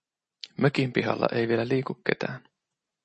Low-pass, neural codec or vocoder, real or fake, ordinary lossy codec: 10.8 kHz; none; real; MP3, 32 kbps